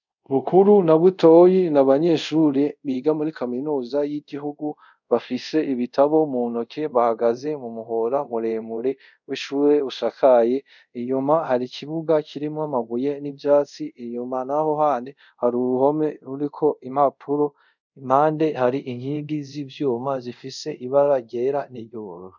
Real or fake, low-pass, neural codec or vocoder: fake; 7.2 kHz; codec, 24 kHz, 0.5 kbps, DualCodec